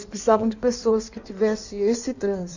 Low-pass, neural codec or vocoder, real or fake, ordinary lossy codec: 7.2 kHz; codec, 16 kHz in and 24 kHz out, 1.1 kbps, FireRedTTS-2 codec; fake; none